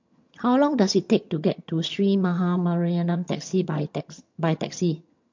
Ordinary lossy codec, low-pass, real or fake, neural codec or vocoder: MP3, 48 kbps; 7.2 kHz; fake; vocoder, 22.05 kHz, 80 mel bands, HiFi-GAN